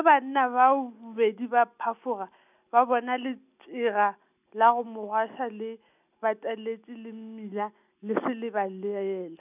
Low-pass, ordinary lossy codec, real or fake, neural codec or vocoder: 3.6 kHz; none; real; none